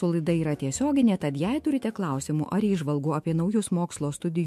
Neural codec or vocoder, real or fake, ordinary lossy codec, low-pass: autoencoder, 48 kHz, 128 numbers a frame, DAC-VAE, trained on Japanese speech; fake; MP3, 64 kbps; 14.4 kHz